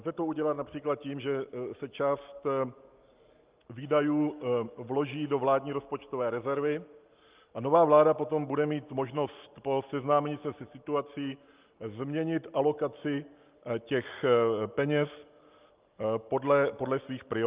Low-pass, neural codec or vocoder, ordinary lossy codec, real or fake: 3.6 kHz; none; Opus, 24 kbps; real